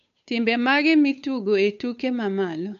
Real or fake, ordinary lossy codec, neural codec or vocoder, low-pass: fake; none; codec, 16 kHz, 8 kbps, FunCodec, trained on Chinese and English, 25 frames a second; 7.2 kHz